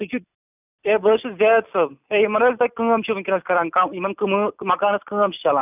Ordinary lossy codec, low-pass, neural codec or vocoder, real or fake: none; 3.6 kHz; none; real